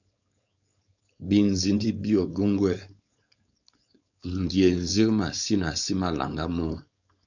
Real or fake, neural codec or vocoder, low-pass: fake; codec, 16 kHz, 4.8 kbps, FACodec; 7.2 kHz